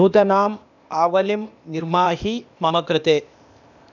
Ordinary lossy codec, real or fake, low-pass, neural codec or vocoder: none; fake; 7.2 kHz; codec, 16 kHz, 0.8 kbps, ZipCodec